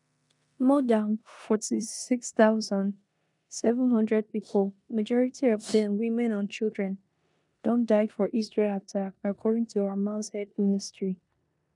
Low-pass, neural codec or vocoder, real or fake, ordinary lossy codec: 10.8 kHz; codec, 16 kHz in and 24 kHz out, 0.9 kbps, LongCat-Audio-Codec, four codebook decoder; fake; none